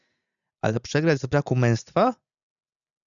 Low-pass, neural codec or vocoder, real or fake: 7.2 kHz; none; real